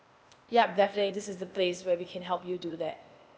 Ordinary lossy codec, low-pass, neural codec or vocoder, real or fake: none; none; codec, 16 kHz, 0.8 kbps, ZipCodec; fake